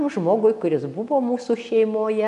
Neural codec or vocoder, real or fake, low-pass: none; real; 10.8 kHz